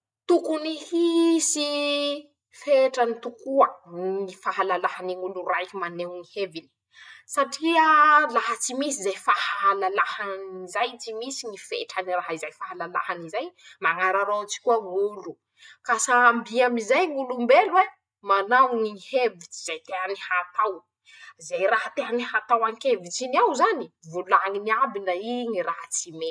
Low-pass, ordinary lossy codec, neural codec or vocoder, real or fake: none; none; none; real